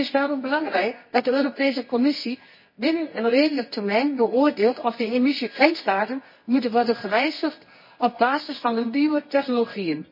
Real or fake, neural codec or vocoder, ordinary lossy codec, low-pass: fake; codec, 24 kHz, 0.9 kbps, WavTokenizer, medium music audio release; MP3, 24 kbps; 5.4 kHz